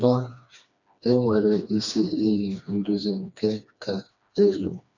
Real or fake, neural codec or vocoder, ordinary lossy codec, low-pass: fake; codec, 16 kHz, 2 kbps, FreqCodec, smaller model; none; 7.2 kHz